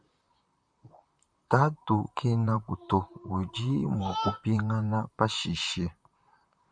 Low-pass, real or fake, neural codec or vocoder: 9.9 kHz; fake; vocoder, 44.1 kHz, 128 mel bands, Pupu-Vocoder